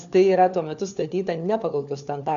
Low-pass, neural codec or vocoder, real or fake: 7.2 kHz; codec, 16 kHz, 4 kbps, FunCodec, trained on LibriTTS, 50 frames a second; fake